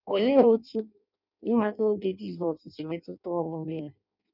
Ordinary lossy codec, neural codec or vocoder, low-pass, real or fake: none; codec, 16 kHz in and 24 kHz out, 0.6 kbps, FireRedTTS-2 codec; 5.4 kHz; fake